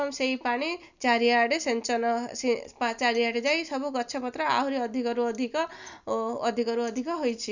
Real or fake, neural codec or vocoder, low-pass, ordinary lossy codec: real; none; 7.2 kHz; none